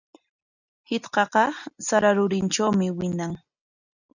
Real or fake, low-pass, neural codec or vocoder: real; 7.2 kHz; none